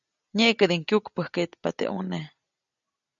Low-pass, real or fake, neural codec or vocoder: 7.2 kHz; real; none